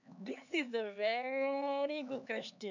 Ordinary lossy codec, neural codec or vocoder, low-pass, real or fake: none; codec, 16 kHz, 4 kbps, X-Codec, HuBERT features, trained on LibriSpeech; 7.2 kHz; fake